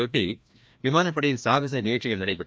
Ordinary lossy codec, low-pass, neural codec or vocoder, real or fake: none; none; codec, 16 kHz, 1 kbps, FreqCodec, larger model; fake